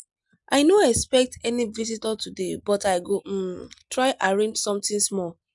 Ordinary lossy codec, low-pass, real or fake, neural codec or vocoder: none; 10.8 kHz; real; none